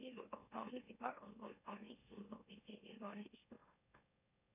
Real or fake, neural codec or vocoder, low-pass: fake; autoencoder, 44.1 kHz, a latent of 192 numbers a frame, MeloTTS; 3.6 kHz